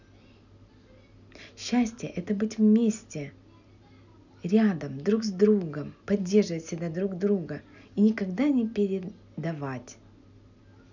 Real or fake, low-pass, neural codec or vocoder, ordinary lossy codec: real; 7.2 kHz; none; none